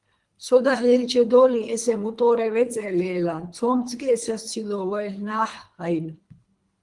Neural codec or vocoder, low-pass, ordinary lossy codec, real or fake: codec, 24 kHz, 3 kbps, HILCodec; 10.8 kHz; Opus, 24 kbps; fake